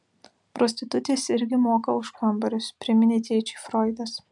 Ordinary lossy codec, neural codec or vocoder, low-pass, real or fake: MP3, 96 kbps; none; 10.8 kHz; real